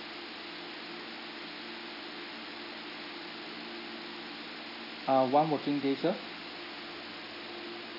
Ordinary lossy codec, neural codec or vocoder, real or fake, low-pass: none; none; real; 5.4 kHz